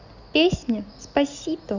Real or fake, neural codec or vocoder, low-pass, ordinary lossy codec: real; none; 7.2 kHz; none